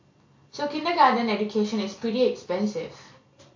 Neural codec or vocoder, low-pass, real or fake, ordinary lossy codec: none; 7.2 kHz; real; AAC, 48 kbps